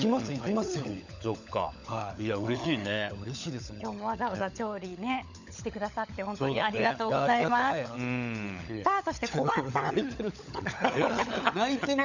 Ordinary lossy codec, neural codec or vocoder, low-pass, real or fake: none; codec, 16 kHz, 16 kbps, FunCodec, trained on LibriTTS, 50 frames a second; 7.2 kHz; fake